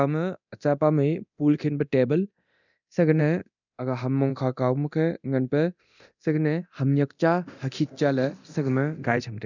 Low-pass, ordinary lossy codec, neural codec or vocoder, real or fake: 7.2 kHz; none; codec, 24 kHz, 0.9 kbps, DualCodec; fake